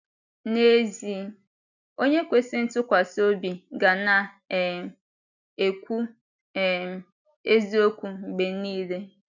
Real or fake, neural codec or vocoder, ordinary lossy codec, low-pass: real; none; none; 7.2 kHz